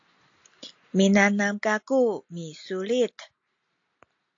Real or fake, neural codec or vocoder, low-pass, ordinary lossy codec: real; none; 7.2 kHz; AAC, 48 kbps